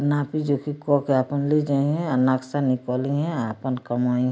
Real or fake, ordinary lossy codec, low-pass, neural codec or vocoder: real; none; none; none